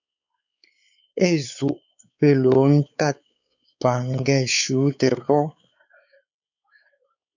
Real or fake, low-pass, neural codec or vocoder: fake; 7.2 kHz; codec, 16 kHz, 4 kbps, X-Codec, WavLM features, trained on Multilingual LibriSpeech